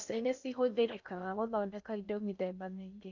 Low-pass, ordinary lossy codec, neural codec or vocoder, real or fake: 7.2 kHz; none; codec, 16 kHz in and 24 kHz out, 0.6 kbps, FocalCodec, streaming, 4096 codes; fake